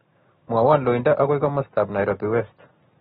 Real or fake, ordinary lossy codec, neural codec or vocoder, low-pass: real; AAC, 16 kbps; none; 9.9 kHz